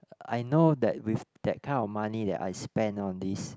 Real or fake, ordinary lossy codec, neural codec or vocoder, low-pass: real; none; none; none